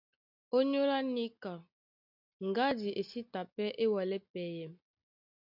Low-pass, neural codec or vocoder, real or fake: 5.4 kHz; none; real